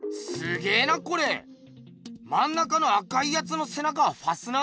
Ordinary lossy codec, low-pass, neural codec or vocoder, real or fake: none; none; none; real